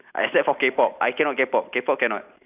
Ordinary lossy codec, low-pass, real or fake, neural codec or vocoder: none; 3.6 kHz; real; none